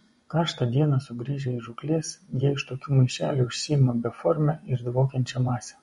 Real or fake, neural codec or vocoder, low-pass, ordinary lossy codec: fake; vocoder, 24 kHz, 100 mel bands, Vocos; 10.8 kHz; MP3, 48 kbps